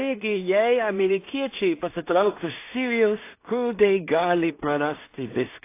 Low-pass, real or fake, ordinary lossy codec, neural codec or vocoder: 3.6 kHz; fake; AAC, 24 kbps; codec, 16 kHz in and 24 kHz out, 0.4 kbps, LongCat-Audio-Codec, two codebook decoder